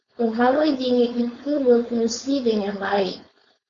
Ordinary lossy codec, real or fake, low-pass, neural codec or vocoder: Opus, 64 kbps; fake; 7.2 kHz; codec, 16 kHz, 4.8 kbps, FACodec